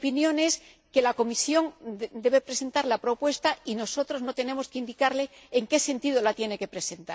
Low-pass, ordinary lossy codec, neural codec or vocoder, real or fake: none; none; none; real